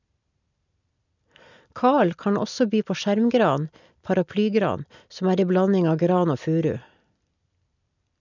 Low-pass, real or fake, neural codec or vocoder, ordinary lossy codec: 7.2 kHz; real; none; none